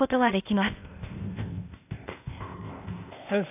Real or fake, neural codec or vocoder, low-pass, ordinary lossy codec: fake; codec, 16 kHz, 0.8 kbps, ZipCodec; 3.6 kHz; AAC, 32 kbps